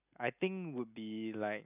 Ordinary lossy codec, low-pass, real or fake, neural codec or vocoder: none; 3.6 kHz; real; none